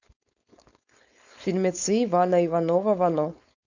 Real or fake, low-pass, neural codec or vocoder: fake; 7.2 kHz; codec, 16 kHz, 4.8 kbps, FACodec